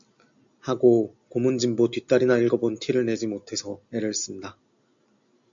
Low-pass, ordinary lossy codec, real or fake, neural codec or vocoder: 7.2 kHz; AAC, 64 kbps; real; none